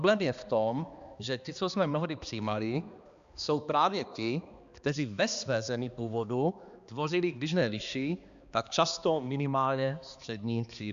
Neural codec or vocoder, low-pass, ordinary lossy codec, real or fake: codec, 16 kHz, 2 kbps, X-Codec, HuBERT features, trained on balanced general audio; 7.2 kHz; Opus, 64 kbps; fake